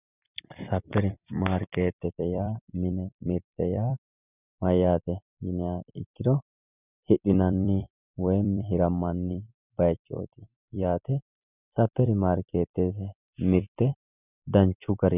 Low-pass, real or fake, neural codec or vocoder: 3.6 kHz; fake; vocoder, 44.1 kHz, 128 mel bands every 256 samples, BigVGAN v2